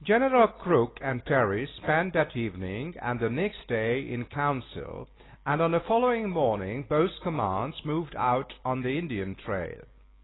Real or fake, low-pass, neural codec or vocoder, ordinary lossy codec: real; 7.2 kHz; none; AAC, 16 kbps